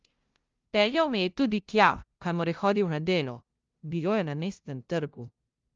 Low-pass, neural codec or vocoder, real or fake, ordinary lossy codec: 7.2 kHz; codec, 16 kHz, 0.5 kbps, FunCodec, trained on LibriTTS, 25 frames a second; fake; Opus, 32 kbps